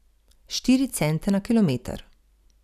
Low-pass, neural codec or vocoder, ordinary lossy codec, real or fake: 14.4 kHz; none; none; real